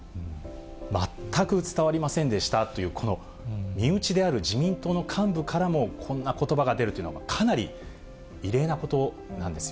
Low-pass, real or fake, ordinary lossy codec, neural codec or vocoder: none; real; none; none